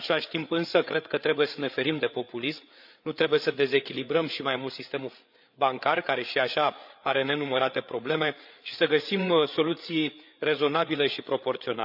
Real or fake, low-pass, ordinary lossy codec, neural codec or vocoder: fake; 5.4 kHz; none; codec, 16 kHz, 8 kbps, FreqCodec, larger model